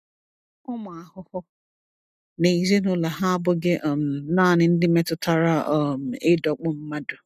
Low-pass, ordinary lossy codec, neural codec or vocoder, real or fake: 14.4 kHz; none; none; real